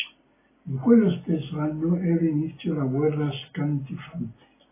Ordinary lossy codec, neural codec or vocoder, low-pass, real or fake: AAC, 16 kbps; none; 3.6 kHz; real